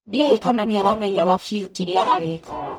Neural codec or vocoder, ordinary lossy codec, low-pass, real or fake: codec, 44.1 kHz, 0.9 kbps, DAC; none; 19.8 kHz; fake